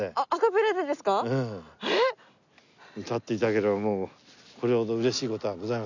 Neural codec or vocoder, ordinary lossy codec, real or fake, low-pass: none; none; real; 7.2 kHz